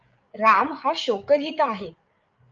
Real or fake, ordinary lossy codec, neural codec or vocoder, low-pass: fake; Opus, 24 kbps; codec, 16 kHz, 8 kbps, FreqCodec, larger model; 7.2 kHz